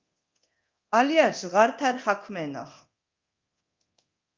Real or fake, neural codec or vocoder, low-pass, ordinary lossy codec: fake; codec, 24 kHz, 0.9 kbps, DualCodec; 7.2 kHz; Opus, 24 kbps